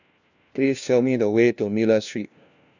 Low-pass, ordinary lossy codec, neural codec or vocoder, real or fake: 7.2 kHz; none; codec, 16 kHz, 1 kbps, FunCodec, trained on LibriTTS, 50 frames a second; fake